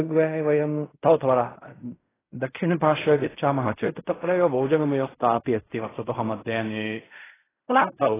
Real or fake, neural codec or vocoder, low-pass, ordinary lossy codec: fake; codec, 16 kHz in and 24 kHz out, 0.4 kbps, LongCat-Audio-Codec, fine tuned four codebook decoder; 3.6 kHz; AAC, 16 kbps